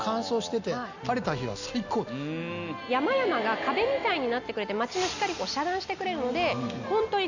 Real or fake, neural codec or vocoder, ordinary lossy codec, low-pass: real; none; none; 7.2 kHz